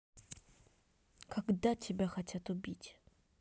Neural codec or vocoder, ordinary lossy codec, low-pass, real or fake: none; none; none; real